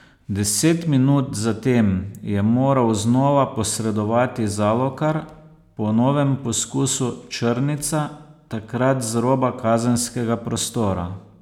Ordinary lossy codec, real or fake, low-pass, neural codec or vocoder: none; real; 19.8 kHz; none